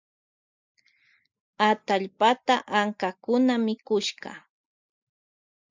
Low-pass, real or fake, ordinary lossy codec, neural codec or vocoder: 7.2 kHz; real; MP3, 64 kbps; none